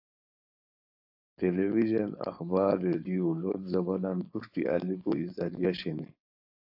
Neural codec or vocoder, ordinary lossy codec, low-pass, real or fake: codec, 16 kHz, 4.8 kbps, FACodec; AAC, 48 kbps; 5.4 kHz; fake